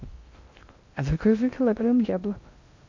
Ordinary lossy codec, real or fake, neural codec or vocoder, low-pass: MP3, 48 kbps; fake; codec, 16 kHz in and 24 kHz out, 0.6 kbps, FocalCodec, streaming, 2048 codes; 7.2 kHz